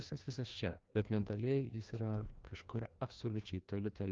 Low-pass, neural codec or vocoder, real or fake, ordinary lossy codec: 7.2 kHz; codec, 16 kHz, 1 kbps, FreqCodec, larger model; fake; Opus, 32 kbps